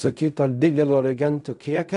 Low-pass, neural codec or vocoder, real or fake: 10.8 kHz; codec, 16 kHz in and 24 kHz out, 0.4 kbps, LongCat-Audio-Codec, fine tuned four codebook decoder; fake